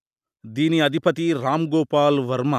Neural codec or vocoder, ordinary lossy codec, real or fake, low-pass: none; none; real; 14.4 kHz